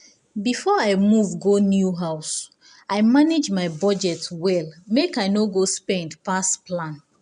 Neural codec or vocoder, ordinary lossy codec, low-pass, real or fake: none; none; 10.8 kHz; real